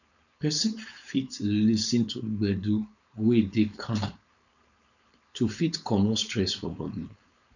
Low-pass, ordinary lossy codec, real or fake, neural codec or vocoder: 7.2 kHz; none; fake; codec, 16 kHz, 4.8 kbps, FACodec